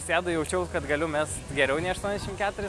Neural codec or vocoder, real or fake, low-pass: none; real; 14.4 kHz